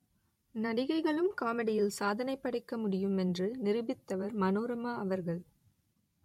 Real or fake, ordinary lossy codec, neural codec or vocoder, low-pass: fake; MP3, 64 kbps; vocoder, 44.1 kHz, 128 mel bands every 512 samples, BigVGAN v2; 19.8 kHz